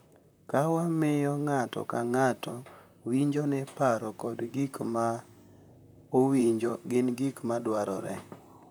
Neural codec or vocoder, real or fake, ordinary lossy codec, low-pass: vocoder, 44.1 kHz, 128 mel bands, Pupu-Vocoder; fake; none; none